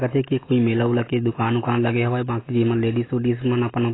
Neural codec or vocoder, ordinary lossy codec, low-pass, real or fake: none; AAC, 16 kbps; 7.2 kHz; real